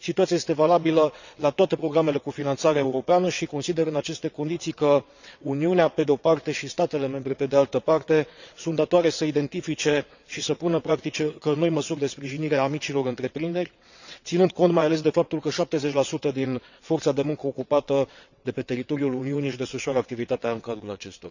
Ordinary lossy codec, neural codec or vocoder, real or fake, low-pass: none; vocoder, 22.05 kHz, 80 mel bands, WaveNeXt; fake; 7.2 kHz